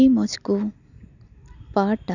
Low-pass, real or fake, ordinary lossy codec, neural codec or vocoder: 7.2 kHz; real; none; none